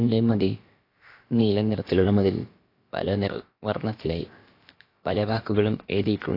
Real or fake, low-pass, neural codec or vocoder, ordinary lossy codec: fake; 5.4 kHz; codec, 16 kHz, about 1 kbps, DyCAST, with the encoder's durations; AAC, 32 kbps